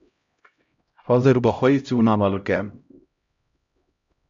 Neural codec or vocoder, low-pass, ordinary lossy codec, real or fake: codec, 16 kHz, 0.5 kbps, X-Codec, HuBERT features, trained on LibriSpeech; 7.2 kHz; AAC, 64 kbps; fake